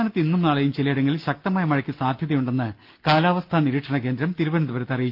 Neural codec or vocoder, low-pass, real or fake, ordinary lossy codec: none; 5.4 kHz; real; Opus, 32 kbps